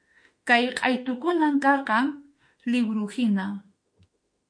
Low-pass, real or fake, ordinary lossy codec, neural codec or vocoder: 9.9 kHz; fake; MP3, 48 kbps; autoencoder, 48 kHz, 32 numbers a frame, DAC-VAE, trained on Japanese speech